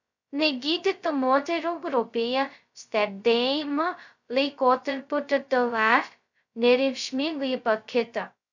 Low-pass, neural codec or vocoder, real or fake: 7.2 kHz; codec, 16 kHz, 0.2 kbps, FocalCodec; fake